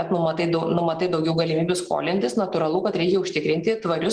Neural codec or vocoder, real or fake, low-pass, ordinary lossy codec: none; real; 9.9 kHz; Opus, 24 kbps